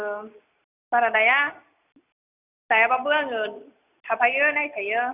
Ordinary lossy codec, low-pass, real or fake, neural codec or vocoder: none; 3.6 kHz; real; none